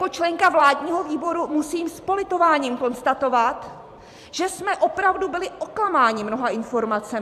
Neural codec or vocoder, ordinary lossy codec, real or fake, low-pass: vocoder, 44.1 kHz, 128 mel bands every 256 samples, BigVGAN v2; AAC, 96 kbps; fake; 14.4 kHz